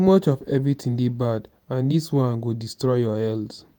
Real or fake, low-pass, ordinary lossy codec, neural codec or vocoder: fake; none; none; vocoder, 48 kHz, 128 mel bands, Vocos